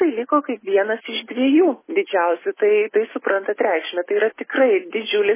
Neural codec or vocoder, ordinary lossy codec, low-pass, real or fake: none; MP3, 16 kbps; 3.6 kHz; real